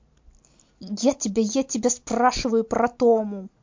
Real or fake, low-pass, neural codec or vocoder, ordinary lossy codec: real; 7.2 kHz; none; MP3, 48 kbps